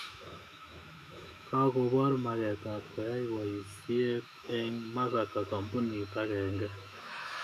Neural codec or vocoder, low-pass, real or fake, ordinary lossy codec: autoencoder, 48 kHz, 128 numbers a frame, DAC-VAE, trained on Japanese speech; 14.4 kHz; fake; none